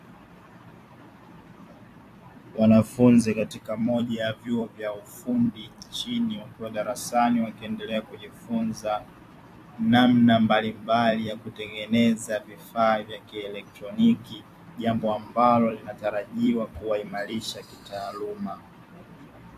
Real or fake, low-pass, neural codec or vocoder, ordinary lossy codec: fake; 14.4 kHz; vocoder, 44.1 kHz, 128 mel bands every 256 samples, BigVGAN v2; AAC, 64 kbps